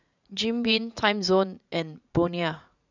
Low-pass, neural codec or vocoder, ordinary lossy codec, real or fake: 7.2 kHz; vocoder, 44.1 kHz, 80 mel bands, Vocos; none; fake